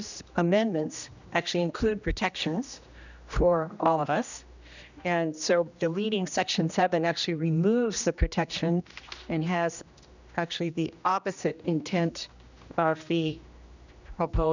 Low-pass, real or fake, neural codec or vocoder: 7.2 kHz; fake; codec, 16 kHz, 1 kbps, X-Codec, HuBERT features, trained on general audio